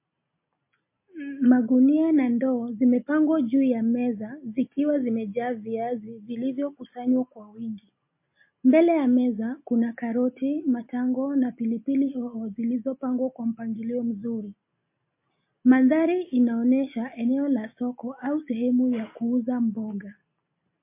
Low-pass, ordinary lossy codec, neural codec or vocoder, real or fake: 3.6 kHz; MP3, 24 kbps; none; real